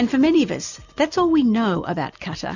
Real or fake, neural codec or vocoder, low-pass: real; none; 7.2 kHz